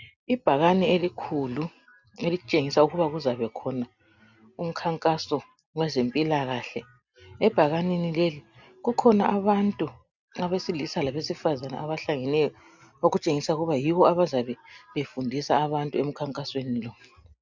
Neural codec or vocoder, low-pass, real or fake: none; 7.2 kHz; real